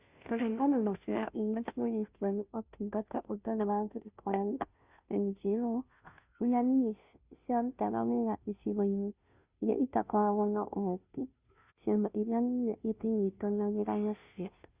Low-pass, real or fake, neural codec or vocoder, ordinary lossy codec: 3.6 kHz; fake; codec, 16 kHz, 0.5 kbps, FunCodec, trained on Chinese and English, 25 frames a second; Opus, 64 kbps